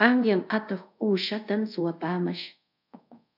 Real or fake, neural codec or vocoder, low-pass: fake; codec, 24 kHz, 0.5 kbps, DualCodec; 5.4 kHz